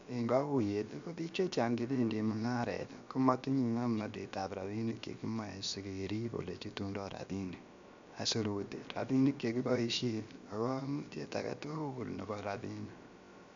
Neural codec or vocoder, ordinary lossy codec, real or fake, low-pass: codec, 16 kHz, about 1 kbps, DyCAST, with the encoder's durations; MP3, 64 kbps; fake; 7.2 kHz